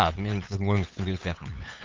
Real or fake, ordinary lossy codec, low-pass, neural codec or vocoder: fake; Opus, 24 kbps; 7.2 kHz; codec, 16 kHz, 8 kbps, FunCodec, trained on LibriTTS, 25 frames a second